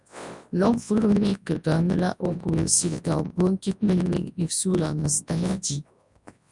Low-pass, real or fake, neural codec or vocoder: 10.8 kHz; fake; codec, 24 kHz, 0.9 kbps, WavTokenizer, large speech release